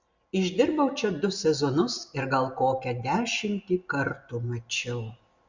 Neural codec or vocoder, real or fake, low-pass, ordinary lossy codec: none; real; 7.2 kHz; Opus, 64 kbps